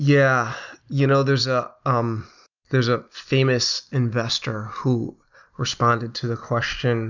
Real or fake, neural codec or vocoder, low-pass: real; none; 7.2 kHz